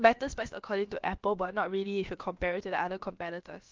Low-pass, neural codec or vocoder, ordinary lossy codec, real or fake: 7.2 kHz; codec, 16 kHz, about 1 kbps, DyCAST, with the encoder's durations; Opus, 32 kbps; fake